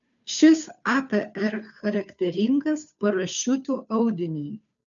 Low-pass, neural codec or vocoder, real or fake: 7.2 kHz; codec, 16 kHz, 2 kbps, FunCodec, trained on Chinese and English, 25 frames a second; fake